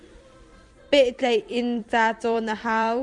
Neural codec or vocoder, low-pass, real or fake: vocoder, 44.1 kHz, 128 mel bands every 256 samples, BigVGAN v2; 10.8 kHz; fake